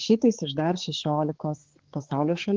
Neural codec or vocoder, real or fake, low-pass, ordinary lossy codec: codec, 16 kHz, 4 kbps, X-Codec, HuBERT features, trained on balanced general audio; fake; 7.2 kHz; Opus, 16 kbps